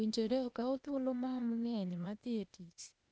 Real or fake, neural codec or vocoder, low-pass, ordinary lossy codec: fake; codec, 16 kHz, 0.8 kbps, ZipCodec; none; none